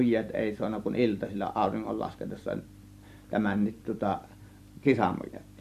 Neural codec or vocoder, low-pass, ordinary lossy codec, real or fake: vocoder, 44.1 kHz, 128 mel bands every 256 samples, BigVGAN v2; 14.4 kHz; MP3, 64 kbps; fake